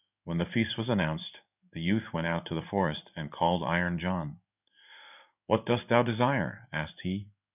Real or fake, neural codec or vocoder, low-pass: fake; codec, 16 kHz in and 24 kHz out, 1 kbps, XY-Tokenizer; 3.6 kHz